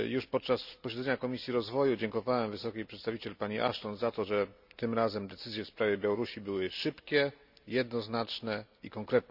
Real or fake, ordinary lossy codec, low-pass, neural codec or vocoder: real; none; 5.4 kHz; none